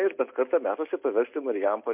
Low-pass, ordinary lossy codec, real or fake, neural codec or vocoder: 3.6 kHz; MP3, 32 kbps; real; none